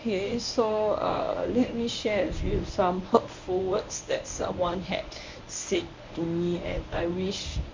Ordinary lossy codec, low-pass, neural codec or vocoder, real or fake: MP3, 64 kbps; 7.2 kHz; codec, 24 kHz, 0.9 kbps, WavTokenizer, medium speech release version 1; fake